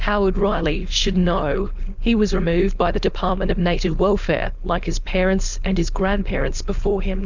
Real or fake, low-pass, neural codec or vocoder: fake; 7.2 kHz; codec, 16 kHz, 4.8 kbps, FACodec